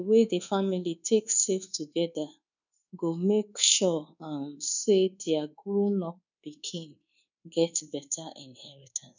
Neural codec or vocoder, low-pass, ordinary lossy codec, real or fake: codec, 24 kHz, 1.2 kbps, DualCodec; 7.2 kHz; none; fake